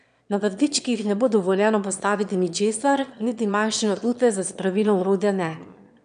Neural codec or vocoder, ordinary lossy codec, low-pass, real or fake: autoencoder, 22.05 kHz, a latent of 192 numbers a frame, VITS, trained on one speaker; none; 9.9 kHz; fake